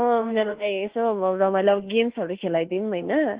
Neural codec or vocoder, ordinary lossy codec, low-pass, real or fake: autoencoder, 48 kHz, 32 numbers a frame, DAC-VAE, trained on Japanese speech; Opus, 16 kbps; 3.6 kHz; fake